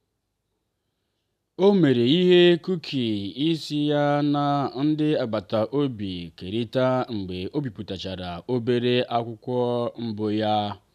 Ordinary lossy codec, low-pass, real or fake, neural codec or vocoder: none; 14.4 kHz; real; none